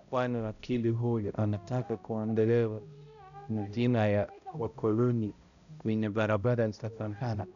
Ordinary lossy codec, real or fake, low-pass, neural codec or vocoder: none; fake; 7.2 kHz; codec, 16 kHz, 0.5 kbps, X-Codec, HuBERT features, trained on balanced general audio